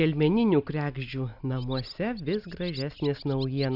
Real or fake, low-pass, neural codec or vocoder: real; 5.4 kHz; none